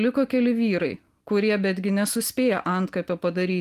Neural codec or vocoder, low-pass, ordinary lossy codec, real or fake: none; 14.4 kHz; Opus, 24 kbps; real